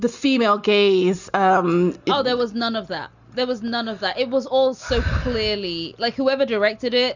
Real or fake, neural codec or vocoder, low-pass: real; none; 7.2 kHz